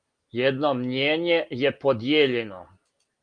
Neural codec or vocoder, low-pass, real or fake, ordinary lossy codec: none; 9.9 kHz; real; Opus, 32 kbps